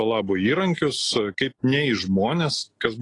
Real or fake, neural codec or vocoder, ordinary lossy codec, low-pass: real; none; AAC, 32 kbps; 10.8 kHz